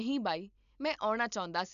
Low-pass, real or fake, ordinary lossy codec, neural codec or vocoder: 7.2 kHz; real; none; none